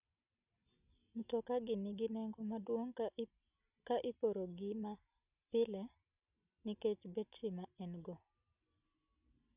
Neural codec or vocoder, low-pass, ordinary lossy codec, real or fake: none; 3.6 kHz; none; real